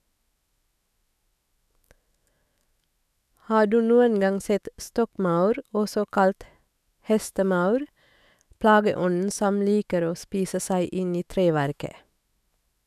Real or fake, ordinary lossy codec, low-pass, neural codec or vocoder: fake; none; 14.4 kHz; autoencoder, 48 kHz, 128 numbers a frame, DAC-VAE, trained on Japanese speech